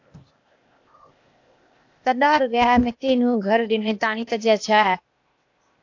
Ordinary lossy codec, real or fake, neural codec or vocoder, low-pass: AAC, 48 kbps; fake; codec, 16 kHz, 0.8 kbps, ZipCodec; 7.2 kHz